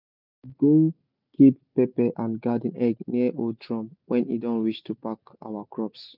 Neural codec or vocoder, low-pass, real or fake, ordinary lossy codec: none; 5.4 kHz; real; none